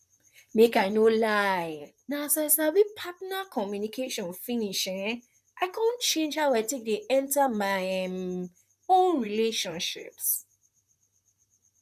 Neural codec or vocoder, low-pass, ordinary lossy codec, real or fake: vocoder, 44.1 kHz, 128 mel bands, Pupu-Vocoder; 14.4 kHz; none; fake